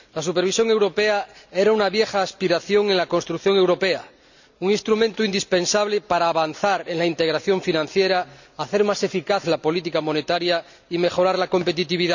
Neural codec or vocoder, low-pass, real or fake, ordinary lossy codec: none; 7.2 kHz; real; none